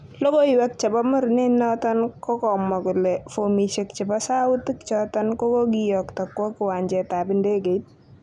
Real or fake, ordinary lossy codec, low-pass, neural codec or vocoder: real; none; none; none